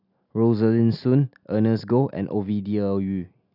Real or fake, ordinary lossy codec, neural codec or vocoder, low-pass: real; none; none; 5.4 kHz